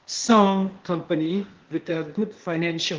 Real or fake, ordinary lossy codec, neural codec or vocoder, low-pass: fake; Opus, 16 kbps; codec, 16 kHz, 0.8 kbps, ZipCodec; 7.2 kHz